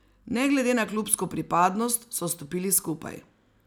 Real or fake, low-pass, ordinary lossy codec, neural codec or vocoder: real; none; none; none